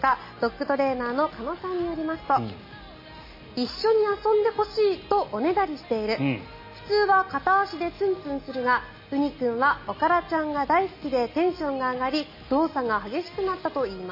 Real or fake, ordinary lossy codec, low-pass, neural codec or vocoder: real; MP3, 24 kbps; 5.4 kHz; none